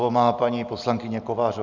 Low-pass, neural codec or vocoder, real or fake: 7.2 kHz; none; real